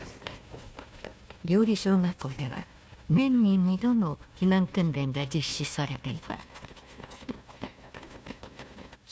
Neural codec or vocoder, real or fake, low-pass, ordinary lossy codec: codec, 16 kHz, 1 kbps, FunCodec, trained on Chinese and English, 50 frames a second; fake; none; none